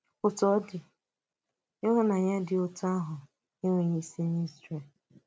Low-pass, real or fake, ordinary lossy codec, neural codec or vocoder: none; real; none; none